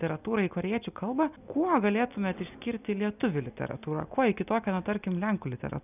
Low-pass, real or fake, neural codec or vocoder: 3.6 kHz; real; none